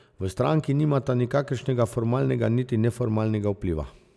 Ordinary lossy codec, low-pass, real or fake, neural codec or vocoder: none; none; real; none